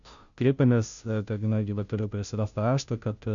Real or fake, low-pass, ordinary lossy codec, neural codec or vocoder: fake; 7.2 kHz; MP3, 96 kbps; codec, 16 kHz, 0.5 kbps, FunCodec, trained on Chinese and English, 25 frames a second